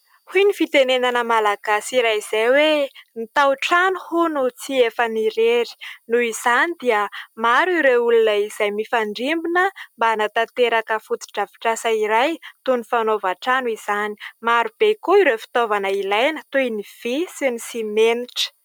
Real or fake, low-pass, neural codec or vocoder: real; 19.8 kHz; none